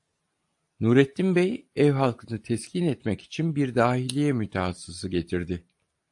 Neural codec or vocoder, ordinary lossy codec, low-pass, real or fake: none; AAC, 64 kbps; 10.8 kHz; real